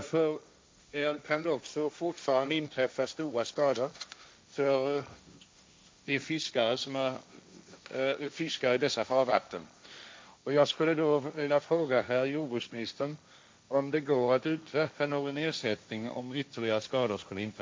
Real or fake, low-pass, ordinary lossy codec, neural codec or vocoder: fake; none; none; codec, 16 kHz, 1.1 kbps, Voila-Tokenizer